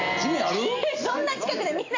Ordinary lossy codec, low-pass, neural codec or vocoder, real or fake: AAC, 48 kbps; 7.2 kHz; none; real